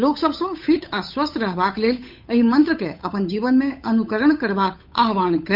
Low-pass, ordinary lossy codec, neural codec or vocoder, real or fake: 5.4 kHz; none; codec, 16 kHz, 8 kbps, FunCodec, trained on Chinese and English, 25 frames a second; fake